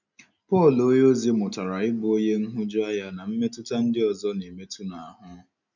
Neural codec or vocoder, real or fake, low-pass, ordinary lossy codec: none; real; 7.2 kHz; none